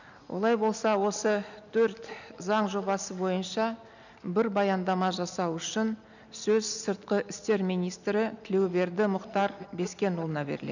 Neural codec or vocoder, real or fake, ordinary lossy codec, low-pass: none; real; none; 7.2 kHz